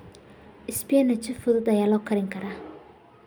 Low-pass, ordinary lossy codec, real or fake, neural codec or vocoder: none; none; real; none